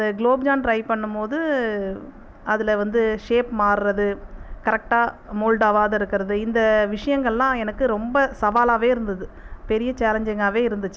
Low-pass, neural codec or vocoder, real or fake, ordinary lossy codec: none; none; real; none